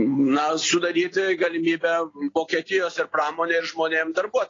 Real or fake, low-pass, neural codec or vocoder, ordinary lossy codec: real; 7.2 kHz; none; AAC, 32 kbps